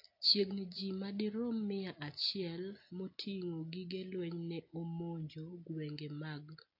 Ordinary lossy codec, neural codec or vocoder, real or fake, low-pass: AAC, 32 kbps; none; real; 5.4 kHz